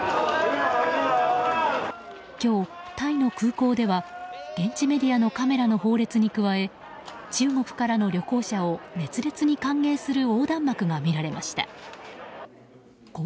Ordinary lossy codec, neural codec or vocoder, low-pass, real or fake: none; none; none; real